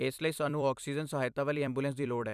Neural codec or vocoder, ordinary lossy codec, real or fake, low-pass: vocoder, 44.1 kHz, 128 mel bands every 256 samples, BigVGAN v2; none; fake; 14.4 kHz